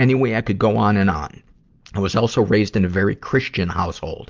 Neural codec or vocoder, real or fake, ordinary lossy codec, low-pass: none; real; Opus, 24 kbps; 7.2 kHz